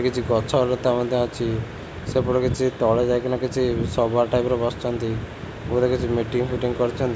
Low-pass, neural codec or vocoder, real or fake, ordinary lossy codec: none; none; real; none